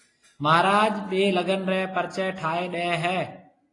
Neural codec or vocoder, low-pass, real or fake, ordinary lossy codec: none; 10.8 kHz; real; AAC, 32 kbps